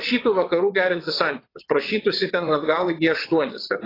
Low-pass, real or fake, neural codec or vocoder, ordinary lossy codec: 5.4 kHz; fake; vocoder, 22.05 kHz, 80 mel bands, WaveNeXt; AAC, 24 kbps